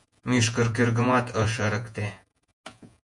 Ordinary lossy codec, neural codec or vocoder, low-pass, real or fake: MP3, 96 kbps; vocoder, 48 kHz, 128 mel bands, Vocos; 10.8 kHz; fake